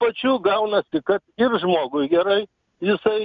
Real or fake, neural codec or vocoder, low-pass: real; none; 7.2 kHz